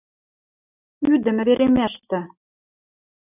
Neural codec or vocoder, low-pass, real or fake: none; 3.6 kHz; real